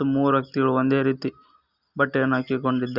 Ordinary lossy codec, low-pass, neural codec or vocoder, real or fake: Opus, 64 kbps; 5.4 kHz; none; real